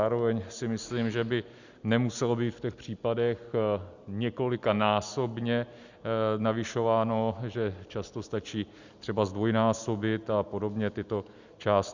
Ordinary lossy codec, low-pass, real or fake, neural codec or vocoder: Opus, 64 kbps; 7.2 kHz; real; none